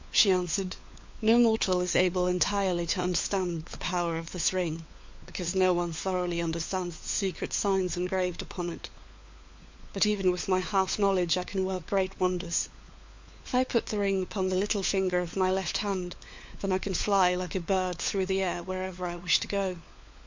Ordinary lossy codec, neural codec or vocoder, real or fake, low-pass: MP3, 48 kbps; codec, 16 kHz, 4 kbps, FunCodec, trained on LibriTTS, 50 frames a second; fake; 7.2 kHz